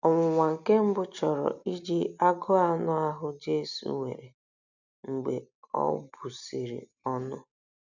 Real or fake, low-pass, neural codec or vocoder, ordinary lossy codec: real; 7.2 kHz; none; none